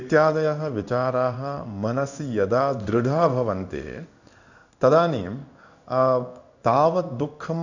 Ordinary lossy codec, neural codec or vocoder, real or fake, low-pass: none; codec, 16 kHz in and 24 kHz out, 1 kbps, XY-Tokenizer; fake; 7.2 kHz